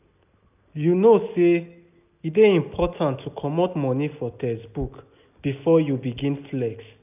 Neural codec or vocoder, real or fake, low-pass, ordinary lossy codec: none; real; 3.6 kHz; none